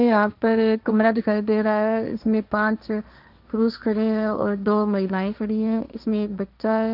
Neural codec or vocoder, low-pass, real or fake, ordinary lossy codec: codec, 16 kHz, 1.1 kbps, Voila-Tokenizer; 5.4 kHz; fake; none